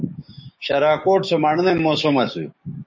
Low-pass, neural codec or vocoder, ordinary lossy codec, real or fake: 7.2 kHz; codec, 16 kHz, 6 kbps, DAC; MP3, 32 kbps; fake